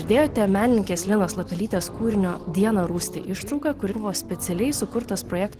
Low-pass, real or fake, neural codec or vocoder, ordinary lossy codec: 14.4 kHz; real; none; Opus, 16 kbps